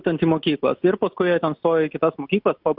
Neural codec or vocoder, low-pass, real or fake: none; 5.4 kHz; real